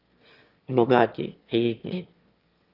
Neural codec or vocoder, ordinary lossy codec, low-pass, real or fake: autoencoder, 22.05 kHz, a latent of 192 numbers a frame, VITS, trained on one speaker; Opus, 24 kbps; 5.4 kHz; fake